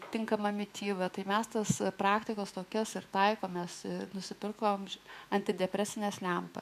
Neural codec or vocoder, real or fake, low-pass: autoencoder, 48 kHz, 128 numbers a frame, DAC-VAE, trained on Japanese speech; fake; 14.4 kHz